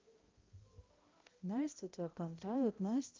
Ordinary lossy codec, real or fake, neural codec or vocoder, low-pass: Opus, 32 kbps; fake; codec, 16 kHz, 0.5 kbps, X-Codec, HuBERT features, trained on balanced general audio; 7.2 kHz